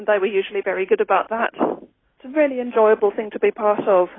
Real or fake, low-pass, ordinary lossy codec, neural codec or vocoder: real; 7.2 kHz; AAC, 16 kbps; none